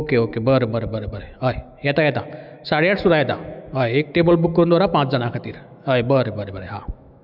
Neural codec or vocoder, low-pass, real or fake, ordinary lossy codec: autoencoder, 48 kHz, 128 numbers a frame, DAC-VAE, trained on Japanese speech; 5.4 kHz; fake; none